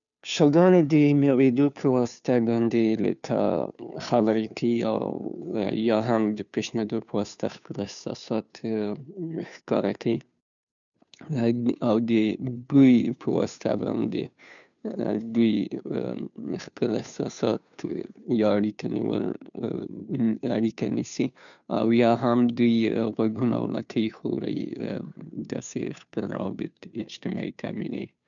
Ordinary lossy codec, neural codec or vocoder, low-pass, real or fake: none; codec, 16 kHz, 2 kbps, FunCodec, trained on Chinese and English, 25 frames a second; 7.2 kHz; fake